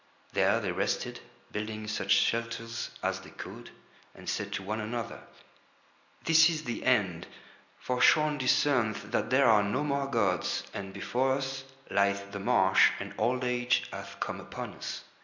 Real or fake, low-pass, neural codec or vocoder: real; 7.2 kHz; none